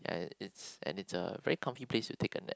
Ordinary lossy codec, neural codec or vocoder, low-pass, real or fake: none; none; none; real